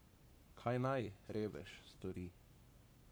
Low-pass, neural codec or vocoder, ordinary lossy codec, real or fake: none; codec, 44.1 kHz, 7.8 kbps, Pupu-Codec; none; fake